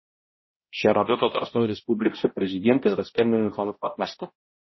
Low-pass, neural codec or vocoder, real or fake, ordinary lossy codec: 7.2 kHz; codec, 16 kHz, 0.5 kbps, X-Codec, HuBERT features, trained on balanced general audio; fake; MP3, 24 kbps